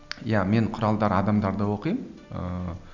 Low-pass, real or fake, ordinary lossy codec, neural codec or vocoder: 7.2 kHz; real; none; none